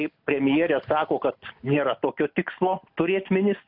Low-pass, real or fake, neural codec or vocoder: 5.4 kHz; real; none